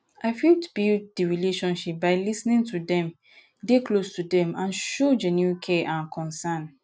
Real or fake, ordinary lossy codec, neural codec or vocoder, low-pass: real; none; none; none